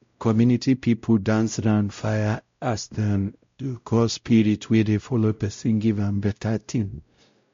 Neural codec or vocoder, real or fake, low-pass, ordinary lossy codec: codec, 16 kHz, 0.5 kbps, X-Codec, WavLM features, trained on Multilingual LibriSpeech; fake; 7.2 kHz; MP3, 48 kbps